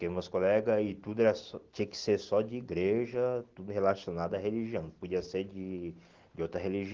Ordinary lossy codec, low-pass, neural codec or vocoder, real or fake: Opus, 16 kbps; 7.2 kHz; none; real